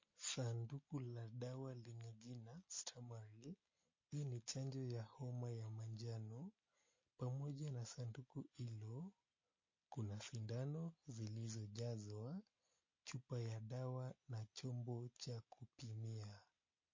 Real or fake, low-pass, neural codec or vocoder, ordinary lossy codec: real; 7.2 kHz; none; MP3, 32 kbps